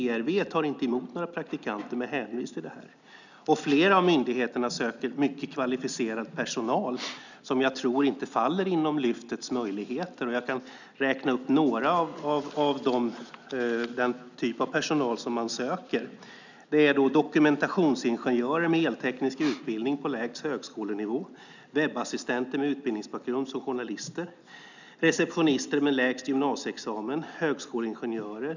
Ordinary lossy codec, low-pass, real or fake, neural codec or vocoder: none; 7.2 kHz; real; none